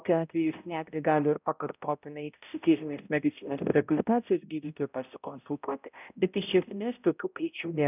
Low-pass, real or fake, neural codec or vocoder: 3.6 kHz; fake; codec, 16 kHz, 0.5 kbps, X-Codec, HuBERT features, trained on balanced general audio